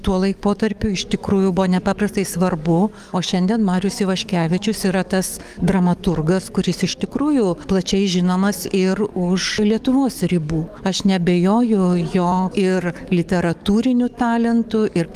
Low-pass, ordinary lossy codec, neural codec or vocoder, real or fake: 14.4 kHz; Opus, 32 kbps; codec, 44.1 kHz, 7.8 kbps, DAC; fake